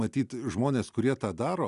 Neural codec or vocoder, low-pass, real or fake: none; 10.8 kHz; real